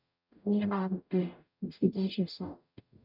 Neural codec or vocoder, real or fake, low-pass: codec, 44.1 kHz, 0.9 kbps, DAC; fake; 5.4 kHz